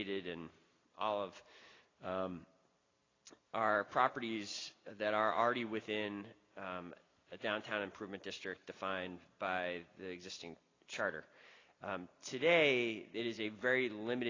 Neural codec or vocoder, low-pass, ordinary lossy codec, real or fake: none; 7.2 kHz; AAC, 32 kbps; real